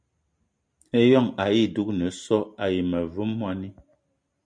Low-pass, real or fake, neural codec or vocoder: 9.9 kHz; real; none